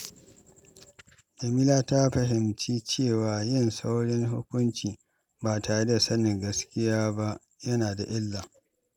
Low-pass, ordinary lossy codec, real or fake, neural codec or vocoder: 19.8 kHz; none; real; none